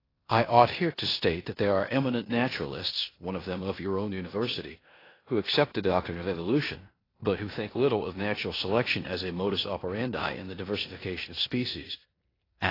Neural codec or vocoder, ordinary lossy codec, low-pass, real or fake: codec, 16 kHz in and 24 kHz out, 0.9 kbps, LongCat-Audio-Codec, four codebook decoder; AAC, 24 kbps; 5.4 kHz; fake